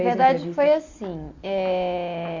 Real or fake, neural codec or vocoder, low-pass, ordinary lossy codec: real; none; 7.2 kHz; AAC, 48 kbps